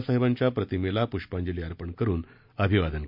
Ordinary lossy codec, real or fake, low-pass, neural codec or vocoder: MP3, 48 kbps; real; 5.4 kHz; none